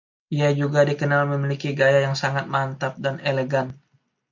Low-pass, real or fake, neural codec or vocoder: 7.2 kHz; real; none